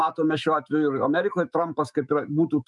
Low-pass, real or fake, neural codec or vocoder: 10.8 kHz; fake; autoencoder, 48 kHz, 128 numbers a frame, DAC-VAE, trained on Japanese speech